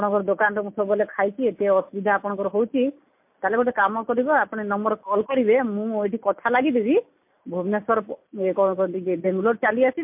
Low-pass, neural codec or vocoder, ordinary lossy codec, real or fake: 3.6 kHz; none; MP3, 32 kbps; real